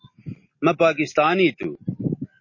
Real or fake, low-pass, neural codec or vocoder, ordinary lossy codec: real; 7.2 kHz; none; MP3, 32 kbps